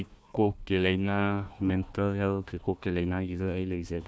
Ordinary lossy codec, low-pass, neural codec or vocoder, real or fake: none; none; codec, 16 kHz, 1 kbps, FunCodec, trained on Chinese and English, 50 frames a second; fake